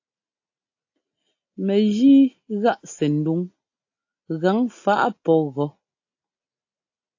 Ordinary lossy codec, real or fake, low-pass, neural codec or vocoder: AAC, 48 kbps; real; 7.2 kHz; none